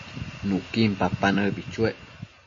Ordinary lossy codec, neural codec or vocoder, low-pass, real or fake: MP3, 32 kbps; none; 7.2 kHz; real